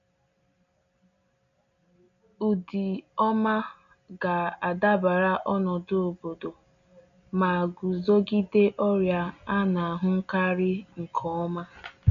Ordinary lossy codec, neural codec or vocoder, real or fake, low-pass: none; none; real; 7.2 kHz